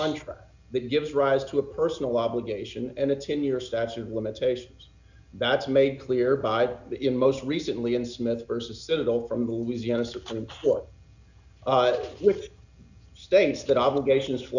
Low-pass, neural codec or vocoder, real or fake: 7.2 kHz; none; real